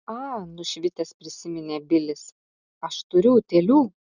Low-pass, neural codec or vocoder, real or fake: 7.2 kHz; none; real